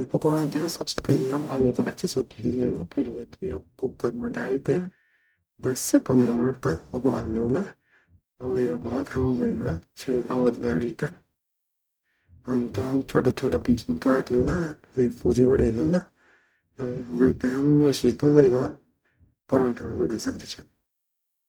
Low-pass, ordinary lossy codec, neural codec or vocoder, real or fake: none; none; codec, 44.1 kHz, 0.9 kbps, DAC; fake